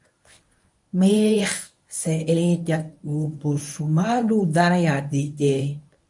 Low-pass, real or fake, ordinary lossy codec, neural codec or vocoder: 10.8 kHz; fake; MP3, 96 kbps; codec, 24 kHz, 0.9 kbps, WavTokenizer, medium speech release version 1